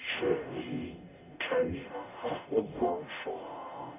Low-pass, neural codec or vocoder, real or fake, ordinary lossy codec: 3.6 kHz; codec, 44.1 kHz, 0.9 kbps, DAC; fake; none